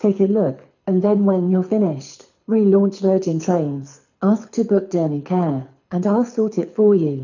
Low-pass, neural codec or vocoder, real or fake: 7.2 kHz; codec, 24 kHz, 6 kbps, HILCodec; fake